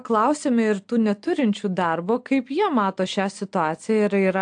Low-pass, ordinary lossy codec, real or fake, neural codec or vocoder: 9.9 kHz; AAC, 64 kbps; real; none